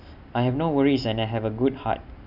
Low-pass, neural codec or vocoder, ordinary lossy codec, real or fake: 5.4 kHz; vocoder, 44.1 kHz, 128 mel bands every 256 samples, BigVGAN v2; none; fake